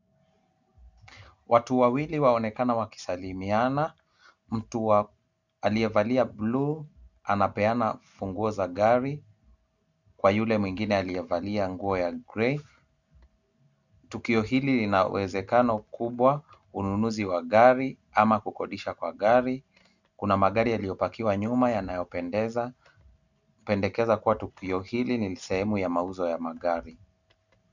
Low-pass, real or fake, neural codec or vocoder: 7.2 kHz; real; none